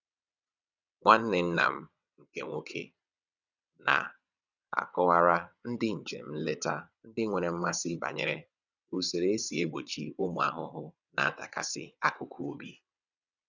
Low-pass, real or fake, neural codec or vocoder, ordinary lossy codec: 7.2 kHz; fake; vocoder, 22.05 kHz, 80 mel bands, WaveNeXt; none